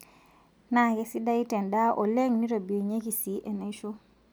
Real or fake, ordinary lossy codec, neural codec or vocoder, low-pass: real; none; none; none